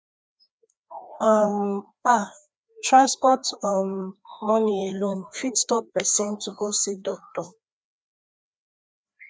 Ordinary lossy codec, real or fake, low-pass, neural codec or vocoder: none; fake; none; codec, 16 kHz, 2 kbps, FreqCodec, larger model